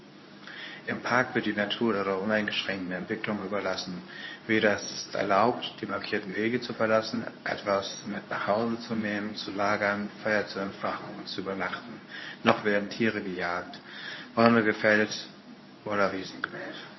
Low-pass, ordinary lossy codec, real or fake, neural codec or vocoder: 7.2 kHz; MP3, 24 kbps; fake; codec, 24 kHz, 0.9 kbps, WavTokenizer, medium speech release version 2